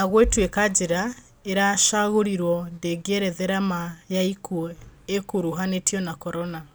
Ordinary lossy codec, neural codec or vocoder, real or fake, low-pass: none; none; real; none